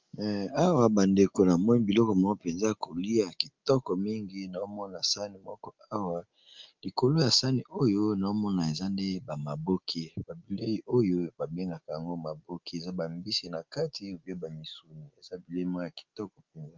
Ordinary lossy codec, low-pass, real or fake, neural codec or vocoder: Opus, 24 kbps; 7.2 kHz; real; none